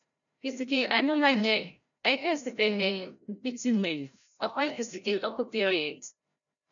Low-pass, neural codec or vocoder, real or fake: 7.2 kHz; codec, 16 kHz, 0.5 kbps, FreqCodec, larger model; fake